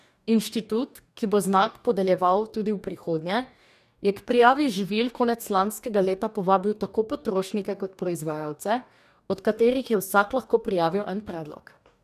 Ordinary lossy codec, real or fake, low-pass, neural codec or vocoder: none; fake; 14.4 kHz; codec, 44.1 kHz, 2.6 kbps, DAC